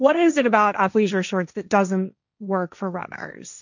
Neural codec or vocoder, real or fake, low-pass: codec, 16 kHz, 1.1 kbps, Voila-Tokenizer; fake; 7.2 kHz